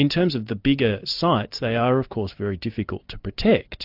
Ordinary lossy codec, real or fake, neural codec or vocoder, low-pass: AAC, 48 kbps; real; none; 5.4 kHz